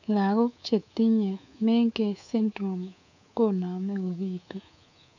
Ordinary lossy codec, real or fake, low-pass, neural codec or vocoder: none; fake; 7.2 kHz; codec, 24 kHz, 3.1 kbps, DualCodec